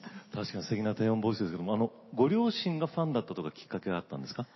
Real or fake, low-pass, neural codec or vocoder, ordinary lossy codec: real; 7.2 kHz; none; MP3, 24 kbps